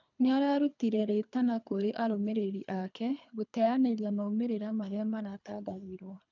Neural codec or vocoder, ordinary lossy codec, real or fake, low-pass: codec, 24 kHz, 3 kbps, HILCodec; none; fake; 7.2 kHz